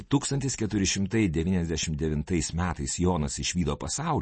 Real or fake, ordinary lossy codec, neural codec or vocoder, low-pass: real; MP3, 32 kbps; none; 10.8 kHz